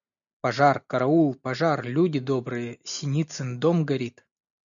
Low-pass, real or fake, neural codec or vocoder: 7.2 kHz; real; none